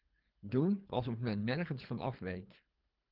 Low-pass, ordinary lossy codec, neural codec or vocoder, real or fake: 5.4 kHz; Opus, 32 kbps; codec, 24 kHz, 3 kbps, HILCodec; fake